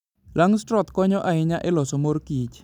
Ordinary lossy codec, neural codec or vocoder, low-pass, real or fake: none; none; 19.8 kHz; real